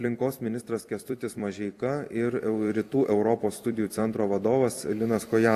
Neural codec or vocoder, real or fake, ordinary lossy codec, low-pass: vocoder, 44.1 kHz, 128 mel bands every 256 samples, BigVGAN v2; fake; AAC, 64 kbps; 14.4 kHz